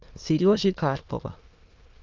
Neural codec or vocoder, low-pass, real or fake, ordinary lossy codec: autoencoder, 22.05 kHz, a latent of 192 numbers a frame, VITS, trained on many speakers; 7.2 kHz; fake; Opus, 24 kbps